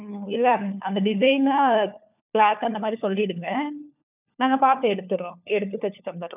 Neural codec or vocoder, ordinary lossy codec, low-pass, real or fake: codec, 16 kHz, 4 kbps, FunCodec, trained on LibriTTS, 50 frames a second; none; 3.6 kHz; fake